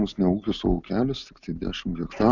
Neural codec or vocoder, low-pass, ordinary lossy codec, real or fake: none; 7.2 kHz; Opus, 64 kbps; real